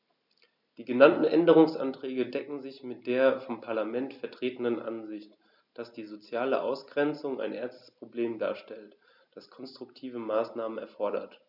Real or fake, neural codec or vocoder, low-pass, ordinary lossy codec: real; none; 5.4 kHz; none